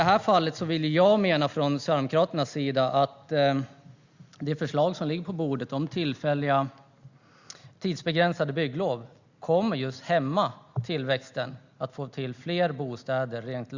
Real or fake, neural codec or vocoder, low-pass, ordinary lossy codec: real; none; 7.2 kHz; Opus, 64 kbps